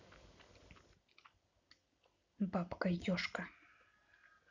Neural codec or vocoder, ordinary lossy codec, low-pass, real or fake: none; none; 7.2 kHz; real